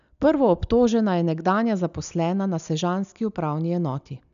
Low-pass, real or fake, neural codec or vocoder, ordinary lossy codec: 7.2 kHz; real; none; none